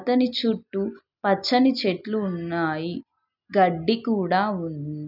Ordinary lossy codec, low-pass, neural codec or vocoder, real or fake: none; 5.4 kHz; none; real